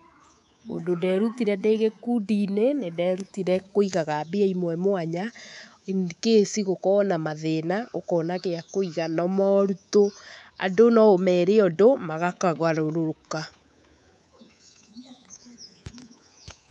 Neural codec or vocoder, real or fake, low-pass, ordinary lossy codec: codec, 24 kHz, 3.1 kbps, DualCodec; fake; 10.8 kHz; none